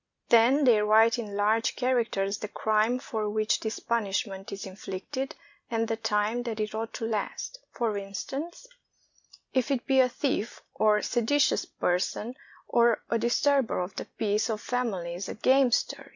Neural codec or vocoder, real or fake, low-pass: none; real; 7.2 kHz